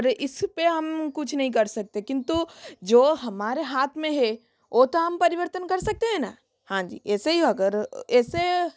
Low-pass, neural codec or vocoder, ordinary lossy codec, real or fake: none; none; none; real